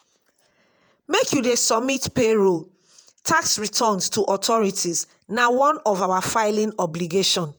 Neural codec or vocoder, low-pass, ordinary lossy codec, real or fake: vocoder, 48 kHz, 128 mel bands, Vocos; none; none; fake